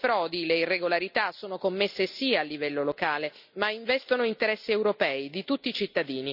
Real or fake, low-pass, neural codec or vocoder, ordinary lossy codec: real; 5.4 kHz; none; none